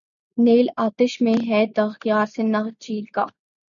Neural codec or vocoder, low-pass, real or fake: none; 7.2 kHz; real